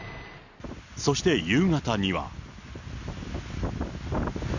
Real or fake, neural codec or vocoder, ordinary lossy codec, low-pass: real; none; none; 7.2 kHz